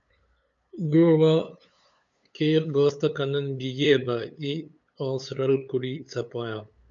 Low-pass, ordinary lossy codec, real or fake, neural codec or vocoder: 7.2 kHz; MP3, 64 kbps; fake; codec, 16 kHz, 8 kbps, FunCodec, trained on LibriTTS, 25 frames a second